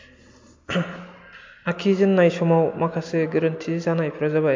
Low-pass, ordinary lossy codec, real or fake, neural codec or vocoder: 7.2 kHz; MP3, 48 kbps; real; none